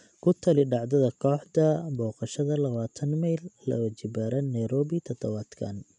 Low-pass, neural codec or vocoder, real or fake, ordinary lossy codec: 10.8 kHz; none; real; none